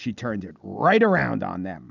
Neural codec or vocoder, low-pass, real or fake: none; 7.2 kHz; real